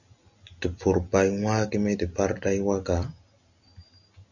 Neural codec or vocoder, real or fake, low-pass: none; real; 7.2 kHz